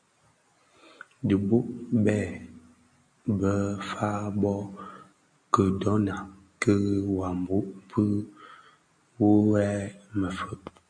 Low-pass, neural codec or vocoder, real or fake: 9.9 kHz; none; real